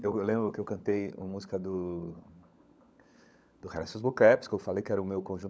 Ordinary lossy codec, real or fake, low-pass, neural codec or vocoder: none; fake; none; codec, 16 kHz, 16 kbps, FunCodec, trained on LibriTTS, 50 frames a second